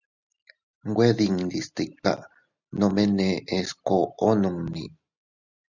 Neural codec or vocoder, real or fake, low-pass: none; real; 7.2 kHz